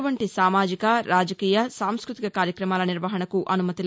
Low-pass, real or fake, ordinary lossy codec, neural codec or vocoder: none; real; none; none